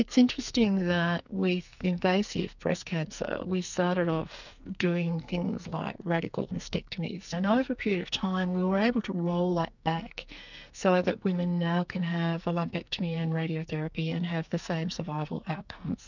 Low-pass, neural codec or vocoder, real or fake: 7.2 kHz; codec, 44.1 kHz, 2.6 kbps, SNAC; fake